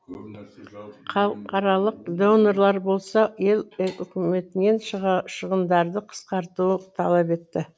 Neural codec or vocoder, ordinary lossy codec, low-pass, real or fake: none; none; none; real